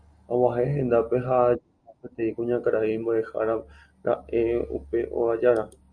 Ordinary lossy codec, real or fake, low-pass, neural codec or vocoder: Opus, 64 kbps; real; 9.9 kHz; none